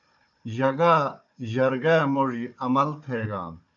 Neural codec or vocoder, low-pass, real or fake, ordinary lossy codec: codec, 16 kHz, 4 kbps, FunCodec, trained on Chinese and English, 50 frames a second; 7.2 kHz; fake; AAC, 64 kbps